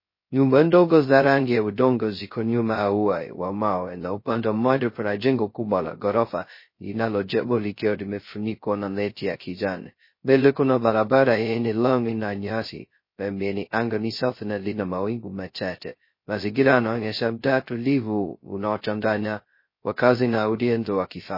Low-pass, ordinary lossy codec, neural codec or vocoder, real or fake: 5.4 kHz; MP3, 24 kbps; codec, 16 kHz, 0.2 kbps, FocalCodec; fake